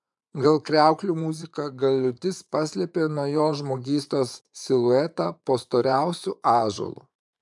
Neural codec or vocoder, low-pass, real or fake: vocoder, 24 kHz, 100 mel bands, Vocos; 10.8 kHz; fake